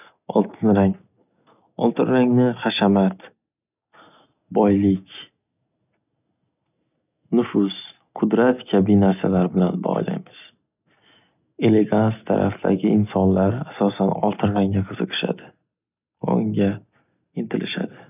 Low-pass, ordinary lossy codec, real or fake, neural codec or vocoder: 3.6 kHz; none; real; none